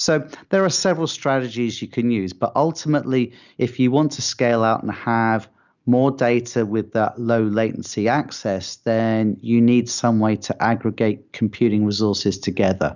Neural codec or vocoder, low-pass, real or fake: none; 7.2 kHz; real